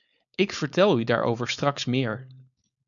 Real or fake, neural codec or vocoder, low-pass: fake; codec, 16 kHz, 4.8 kbps, FACodec; 7.2 kHz